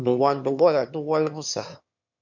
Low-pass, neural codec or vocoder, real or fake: 7.2 kHz; autoencoder, 22.05 kHz, a latent of 192 numbers a frame, VITS, trained on one speaker; fake